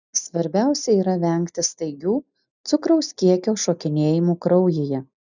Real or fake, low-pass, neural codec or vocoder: real; 7.2 kHz; none